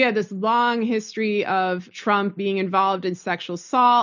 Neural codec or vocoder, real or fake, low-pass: none; real; 7.2 kHz